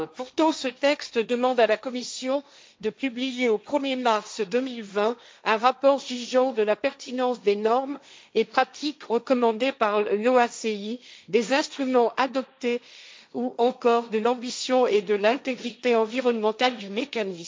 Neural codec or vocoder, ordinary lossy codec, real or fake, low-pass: codec, 16 kHz, 1.1 kbps, Voila-Tokenizer; none; fake; none